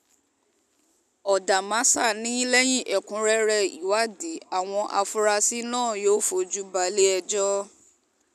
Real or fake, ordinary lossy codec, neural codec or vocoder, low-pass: real; none; none; 14.4 kHz